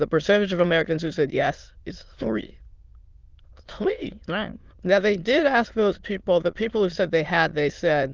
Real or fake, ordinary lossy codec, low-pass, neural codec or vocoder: fake; Opus, 32 kbps; 7.2 kHz; autoencoder, 22.05 kHz, a latent of 192 numbers a frame, VITS, trained on many speakers